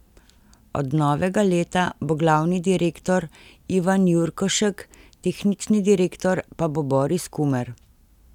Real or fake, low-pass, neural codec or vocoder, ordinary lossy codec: real; 19.8 kHz; none; none